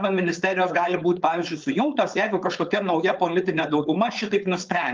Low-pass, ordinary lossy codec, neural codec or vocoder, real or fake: 7.2 kHz; Opus, 24 kbps; codec, 16 kHz, 4.8 kbps, FACodec; fake